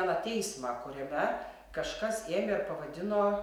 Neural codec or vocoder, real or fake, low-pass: none; real; 19.8 kHz